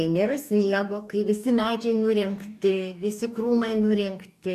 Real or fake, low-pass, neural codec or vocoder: fake; 14.4 kHz; codec, 44.1 kHz, 2.6 kbps, DAC